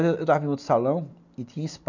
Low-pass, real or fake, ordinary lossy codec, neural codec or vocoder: 7.2 kHz; real; none; none